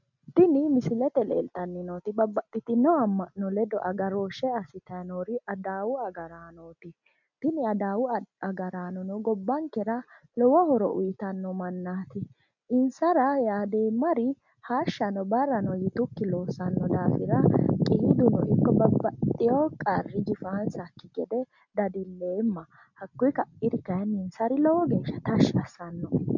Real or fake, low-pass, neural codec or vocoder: real; 7.2 kHz; none